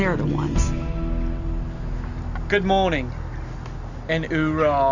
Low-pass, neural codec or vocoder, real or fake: 7.2 kHz; none; real